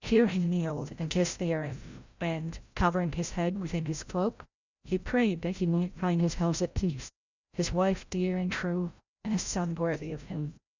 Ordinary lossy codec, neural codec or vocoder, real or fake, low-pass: Opus, 64 kbps; codec, 16 kHz, 0.5 kbps, FreqCodec, larger model; fake; 7.2 kHz